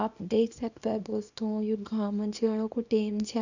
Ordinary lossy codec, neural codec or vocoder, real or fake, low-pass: none; codec, 24 kHz, 0.9 kbps, WavTokenizer, small release; fake; 7.2 kHz